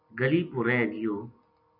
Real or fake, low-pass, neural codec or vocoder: real; 5.4 kHz; none